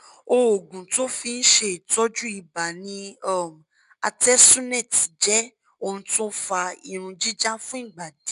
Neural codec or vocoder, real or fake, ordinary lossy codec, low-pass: none; real; none; 10.8 kHz